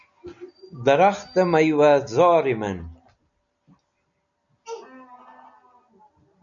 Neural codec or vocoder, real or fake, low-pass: none; real; 7.2 kHz